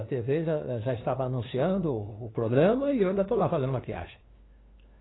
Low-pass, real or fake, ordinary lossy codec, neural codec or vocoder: 7.2 kHz; fake; AAC, 16 kbps; codec, 16 kHz, 2 kbps, FunCodec, trained on LibriTTS, 25 frames a second